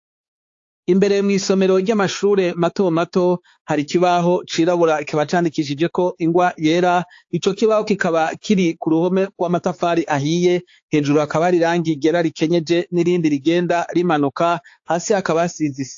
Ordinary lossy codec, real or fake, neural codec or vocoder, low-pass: AAC, 48 kbps; fake; codec, 16 kHz, 4 kbps, X-Codec, HuBERT features, trained on balanced general audio; 7.2 kHz